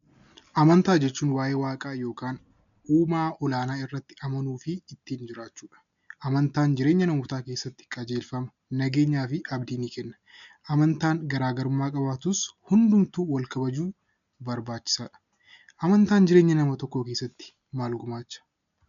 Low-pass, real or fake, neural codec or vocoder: 7.2 kHz; real; none